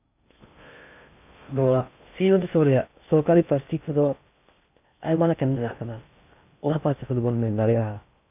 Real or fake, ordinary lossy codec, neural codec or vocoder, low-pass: fake; none; codec, 16 kHz in and 24 kHz out, 0.6 kbps, FocalCodec, streaming, 2048 codes; 3.6 kHz